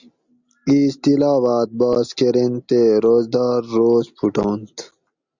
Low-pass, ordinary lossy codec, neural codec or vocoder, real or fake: 7.2 kHz; Opus, 64 kbps; none; real